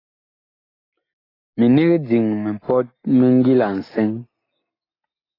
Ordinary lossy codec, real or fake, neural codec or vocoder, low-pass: AAC, 24 kbps; real; none; 5.4 kHz